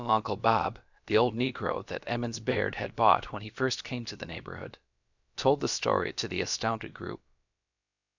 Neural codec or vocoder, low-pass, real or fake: codec, 16 kHz, about 1 kbps, DyCAST, with the encoder's durations; 7.2 kHz; fake